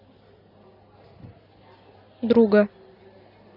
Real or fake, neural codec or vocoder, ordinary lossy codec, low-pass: real; none; none; 5.4 kHz